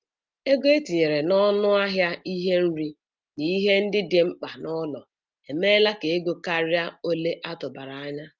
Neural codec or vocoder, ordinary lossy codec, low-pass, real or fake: none; Opus, 24 kbps; 7.2 kHz; real